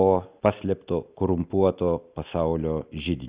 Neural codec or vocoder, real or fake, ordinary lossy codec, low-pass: none; real; Opus, 64 kbps; 3.6 kHz